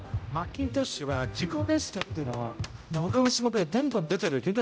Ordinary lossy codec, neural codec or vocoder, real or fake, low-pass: none; codec, 16 kHz, 0.5 kbps, X-Codec, HuBERT features, trained on general audio; fake; none